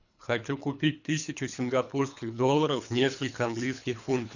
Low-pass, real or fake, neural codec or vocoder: 7.2 kHz; fake; codec, 24 kHz, 3 kbps, HILCodec